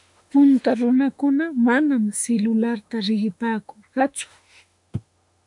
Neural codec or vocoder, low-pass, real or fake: autoencoder, 48 kHz, 32 numbers a frame, DAC-VAE, trained on Japanese speech; 10.8 kHz; fake